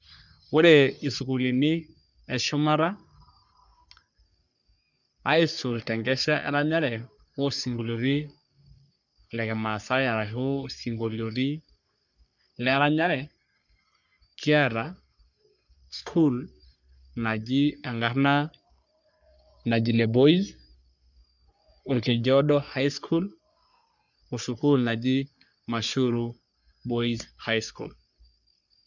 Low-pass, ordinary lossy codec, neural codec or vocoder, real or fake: 7.2 kHz; none; codec, 44.1 kHz, 3.4 kbps, Pupu-Codec; fake